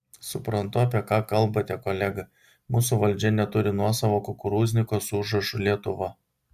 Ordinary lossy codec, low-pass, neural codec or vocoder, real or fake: Opus, 64 kbps; 14.4 kHz; none; real